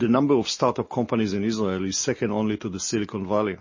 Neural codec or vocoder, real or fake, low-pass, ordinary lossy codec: none; real; 7.2 kHz; MP3, 32 kbps